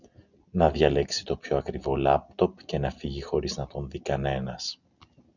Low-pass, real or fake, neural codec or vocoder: 7.2 kHz; real; none